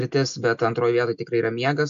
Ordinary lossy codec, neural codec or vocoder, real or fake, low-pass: AAC, 64 kbps; none; real; 7.2 kHz